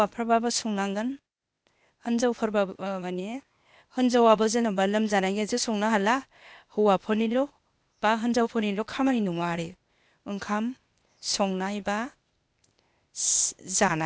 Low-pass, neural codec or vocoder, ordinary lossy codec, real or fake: none; codec, 16 kHz, 0.8 kbps, ZipCodec; none; fake